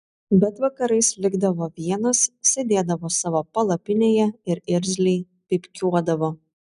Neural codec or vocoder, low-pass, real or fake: none; 10.8 kHz; real